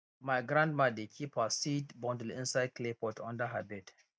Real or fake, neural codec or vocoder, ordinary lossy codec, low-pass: real; none; none; none